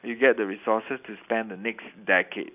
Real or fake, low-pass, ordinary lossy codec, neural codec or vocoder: real; 3.6 kHz; none; none